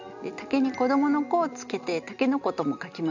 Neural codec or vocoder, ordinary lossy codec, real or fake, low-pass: none; none; real; 7.2 kHz